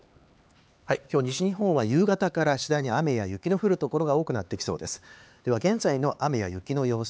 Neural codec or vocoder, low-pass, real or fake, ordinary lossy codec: codec, 16 kHz, 4 kbps, X-Codec, HuBERT features, trained on LibriSpeech; none; fake; none